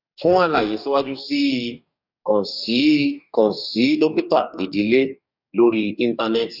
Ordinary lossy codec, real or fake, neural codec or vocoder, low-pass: none; fake; codec, 44.1 kHz, 2.6 kbps, DAC; 5.4 kHz